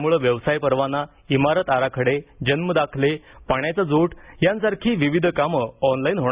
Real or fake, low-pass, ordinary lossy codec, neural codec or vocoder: real; 3.6 kHz; Opus, 64 kbps; none